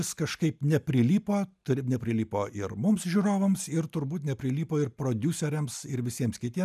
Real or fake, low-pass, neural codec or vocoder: real; 14.4 kHz; none